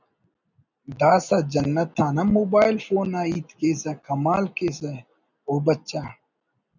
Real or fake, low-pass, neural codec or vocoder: real; 7.2 kHz; none